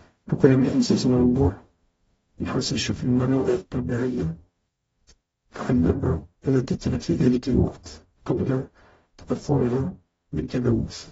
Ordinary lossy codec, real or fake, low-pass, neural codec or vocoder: AAC, 24 kbps; fake; 19.8 kHz; codec, 44.1 kHz, 0.9 kbps, DAC